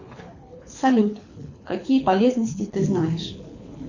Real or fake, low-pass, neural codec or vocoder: fake; 7.2 kHz; codec, 24 kHz, 6 kbps, HILCodec